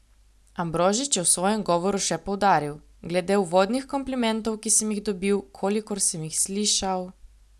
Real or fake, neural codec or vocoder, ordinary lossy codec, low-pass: real; none; none; none